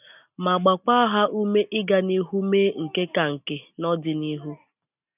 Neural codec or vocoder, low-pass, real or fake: none; 3.6 kHz; real